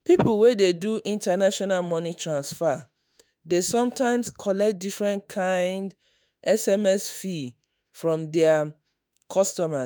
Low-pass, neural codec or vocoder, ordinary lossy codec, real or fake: none; autoencoder, 48 kHz, 32 numbers a frame, DAC-VAE, trained on Japanese speech; none; fake